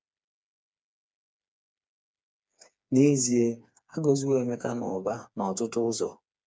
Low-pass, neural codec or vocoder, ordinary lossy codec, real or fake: none; codec, 16 kHz, 4 kbps, FreqCodec, smaller model; none; fake